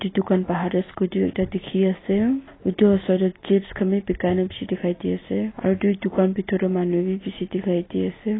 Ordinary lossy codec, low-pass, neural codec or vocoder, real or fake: AAC, 16 kbps; 7.2 kHz; none; real